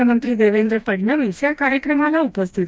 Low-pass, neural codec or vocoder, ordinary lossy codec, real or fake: none; codec, 16 kHz, 1 kbps, FreqCodec, smaller model; none; fake